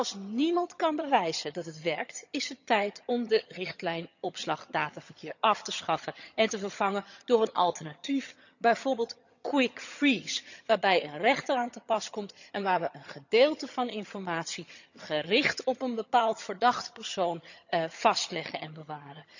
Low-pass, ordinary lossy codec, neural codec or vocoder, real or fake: 7.2 kHz; none; vocoder, 22.05 kHz, 80 mel bands, HiFi-GAN; fake